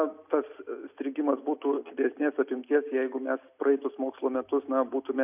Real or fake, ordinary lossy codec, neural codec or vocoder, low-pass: real; AAC, 32 kbps; none; 3.6 kHz